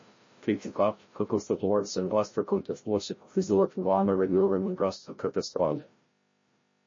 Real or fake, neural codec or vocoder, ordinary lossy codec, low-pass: fake; codec, 16 kHz, 0.5 kbps, FreqCodec, larger model; MP3, 32 kbps; 7.2 kHz